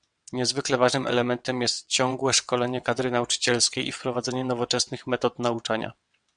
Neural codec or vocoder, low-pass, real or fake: vocoder, 22.05 kHz, 80 mel bands, WaveNeXt; 9.9 kHz; fake